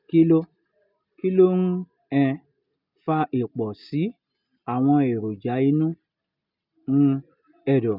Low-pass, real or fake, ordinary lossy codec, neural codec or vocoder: 5.4 kHz; real; none; none